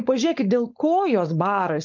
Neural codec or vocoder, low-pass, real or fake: codec, 16 kHz, 4.8 kbps, FACodec; 7.2 kHz; fake